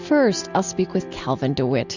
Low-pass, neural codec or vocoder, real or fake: 7.2 kHz; none; real